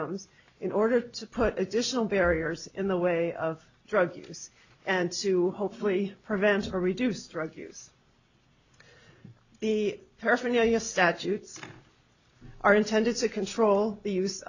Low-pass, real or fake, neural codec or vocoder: 7.2 kHz; real; none